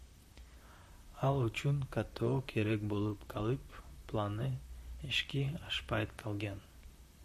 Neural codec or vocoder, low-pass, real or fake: vocoder, 44.1 kHz, 128 mel bands, Pupu-Vocoder; 14.4 kHz; fake